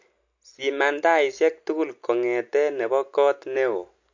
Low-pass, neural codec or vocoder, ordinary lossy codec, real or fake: 7.2 kHz; none; MP3, 48 kbps; real